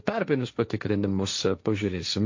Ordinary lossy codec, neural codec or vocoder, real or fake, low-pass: MP3, 48 kbps; codec, 16 kHz, 1.1 kbps, Voila-Tokenizer; fake; 7.2 kHz